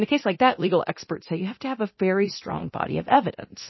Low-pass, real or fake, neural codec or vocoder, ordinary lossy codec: 7.2 kHz; fake; codec, 24 kHz, 0.9 kbps, DualCodec; MP3, 24 kbps